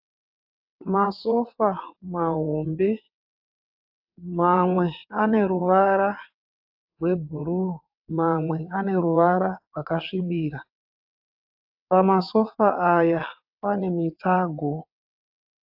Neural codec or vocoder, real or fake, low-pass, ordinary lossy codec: vocoder, 22.05 kHz, 80 mel bands, WaveNeXt; fake; 5.4 kHz; AAC, 48 kbps